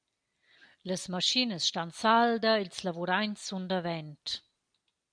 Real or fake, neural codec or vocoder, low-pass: real; none; 9.9 kHz